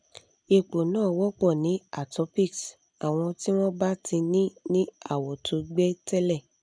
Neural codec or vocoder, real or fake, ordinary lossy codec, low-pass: none; real; none; 9.9 kHz